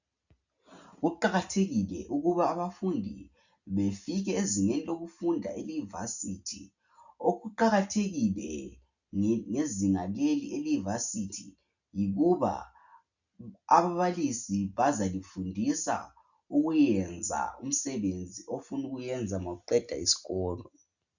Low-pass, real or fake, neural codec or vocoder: 7.2 kHz; real; none